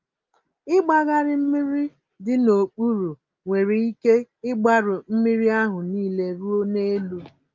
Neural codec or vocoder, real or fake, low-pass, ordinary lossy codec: none; real; 7.2 kHz; Opus, 32 kbps